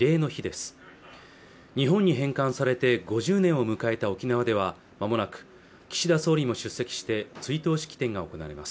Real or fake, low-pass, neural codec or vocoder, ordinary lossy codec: real; none; none; none